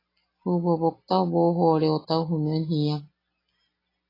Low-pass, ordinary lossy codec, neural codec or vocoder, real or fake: 5.4 kHz; AAC, 24 kbps; none; real